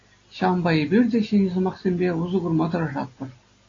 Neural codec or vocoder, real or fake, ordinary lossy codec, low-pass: none; real; AAC, 32 kbps; 7.2 kHz